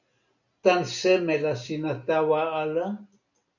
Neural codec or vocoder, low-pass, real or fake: none; 7.2 kHz; real